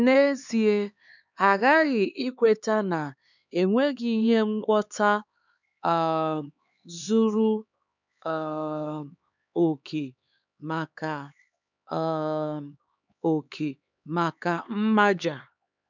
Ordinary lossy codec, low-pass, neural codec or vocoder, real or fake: none; 7.2 kHz; codec, 16 kHz, 4 kbps, X-Codec, HuBERT features, trained on LibriSpeech; fake